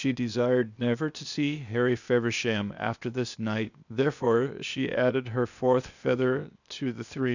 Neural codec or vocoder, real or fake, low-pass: codec, 16 kHz, 0.8 kbps, ZipCodec; fake; 7.2 kHz